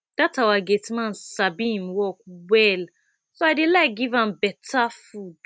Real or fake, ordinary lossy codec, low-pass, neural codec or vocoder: real; none; none; none